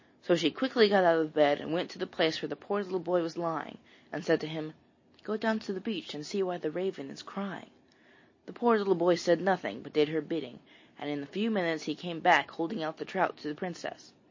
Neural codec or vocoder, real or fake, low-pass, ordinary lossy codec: none; real; 7.2 kHz; MP3, 32 kbps